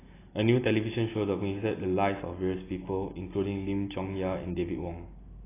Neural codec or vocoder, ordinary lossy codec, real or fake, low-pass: none; AAC, 16 kbps; real; 3.6 kHz